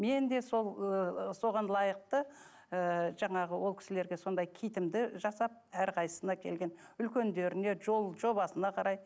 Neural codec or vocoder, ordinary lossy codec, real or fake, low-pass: none; none; real; none